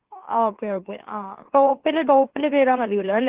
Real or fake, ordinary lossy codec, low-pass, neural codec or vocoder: fake; Opus, 16 kbps; 3.6 kHz; autoencoder, 44.1 kHz, a latent of 192 numbers a frame, MeloTTS